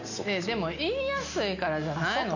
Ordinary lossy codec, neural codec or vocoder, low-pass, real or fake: none; none; 7.2 kHz; real